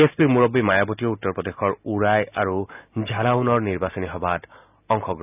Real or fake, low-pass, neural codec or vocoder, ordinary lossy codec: real; 3.6 kHz; none; none